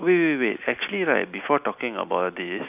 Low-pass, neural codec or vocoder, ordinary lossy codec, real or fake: 3.6 kHz; none; none; real